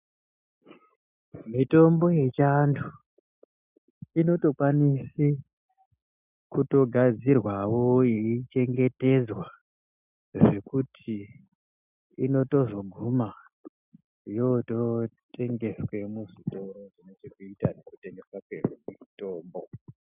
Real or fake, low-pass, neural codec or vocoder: real; 3.6 kHz; none